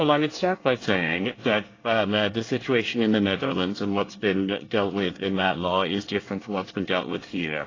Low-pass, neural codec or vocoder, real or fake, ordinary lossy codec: 7.2 kHz; codec, 24 kHz, 1 kbps, SNAC; fake; AAC, 32 kbps